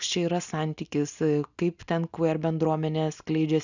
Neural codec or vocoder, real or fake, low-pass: none; real; 7.2 kHz